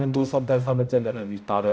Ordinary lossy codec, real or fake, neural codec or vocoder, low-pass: none; fake; codec, 16 kHz, 0.5 kbps, X-Codec, HuBERT features, trained on balanced general audio; none